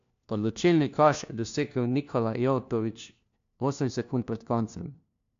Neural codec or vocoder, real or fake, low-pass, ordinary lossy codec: codec, 16 kHz, 1 kbps, FunCodec, trained on LibriTTS, 50 frames a second; fake; 7.2 kHz; AAC, 64 kbps